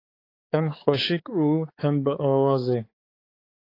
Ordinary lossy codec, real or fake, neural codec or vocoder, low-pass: AAC, 24 kbps; fake; codec, 16 kHz, 4 kbps, X-Codec, HuBERT features, trained on balanced general audio; 5.4 kHz